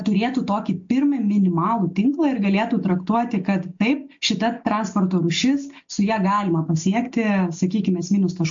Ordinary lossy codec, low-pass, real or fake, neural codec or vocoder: MP3, 48 kbps; 7.2 kHz; real; none